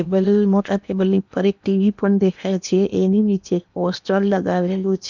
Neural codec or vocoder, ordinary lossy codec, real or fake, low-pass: codec, 16 kHz in and 24 kHz out, 0.8 kbps, FocalCodec, streaming, 65536 codes; none; fake; 7.2 kHz